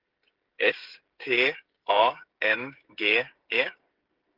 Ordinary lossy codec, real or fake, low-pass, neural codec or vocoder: Opus, 16 kbps; fake; 5.4 kHz; codec, 16 kHz, 16 kbps, FreqCodec, smaller model